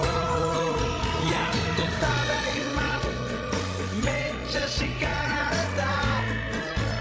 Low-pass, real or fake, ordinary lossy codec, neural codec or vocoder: none; fake; none; codec, 16 kHz, 16 kbps, FreqCodec, larger model